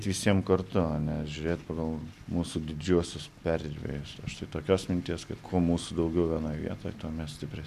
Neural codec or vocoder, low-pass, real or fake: none; 14.4 kHz; real